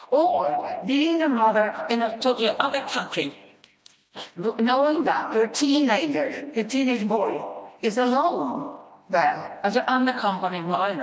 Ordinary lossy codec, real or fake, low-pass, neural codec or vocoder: none; fake; none; codec, 16 kHz, 1 kbps, FreqCodec, smaller model